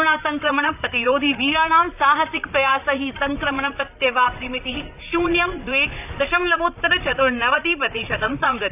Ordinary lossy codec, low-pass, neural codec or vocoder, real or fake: none; 3.6 kHz; vocoder, 44.1 kHz, 128 mel bands, Pupu-Vocoder; fake